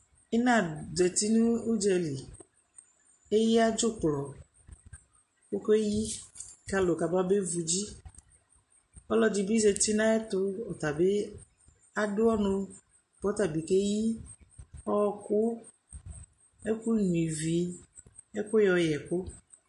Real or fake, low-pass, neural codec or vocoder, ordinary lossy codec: real; 10.8 kHz; none; MP3, 48 kbps